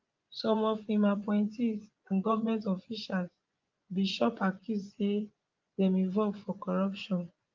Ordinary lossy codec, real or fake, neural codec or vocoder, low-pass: Opus, 24 kbps; fake; vocoder, 24 kHz, 100 mel bands, Vocos; 7.2 kHz